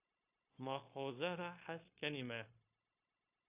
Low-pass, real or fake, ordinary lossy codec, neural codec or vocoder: 3.6 kHz; fake; AAC, 32 kbps; codec, 16 kHz, 0.9 kbps, LongCat-Audio-Codec